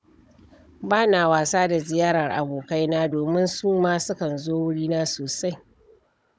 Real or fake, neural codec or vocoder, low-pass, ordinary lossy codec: fake; codec, 16 kHz, 16 kbps, FunCodec, trained on Chinese and English, 50 frames a second; none; none